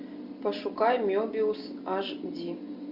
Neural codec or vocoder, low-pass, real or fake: none; 5.4 kHz; real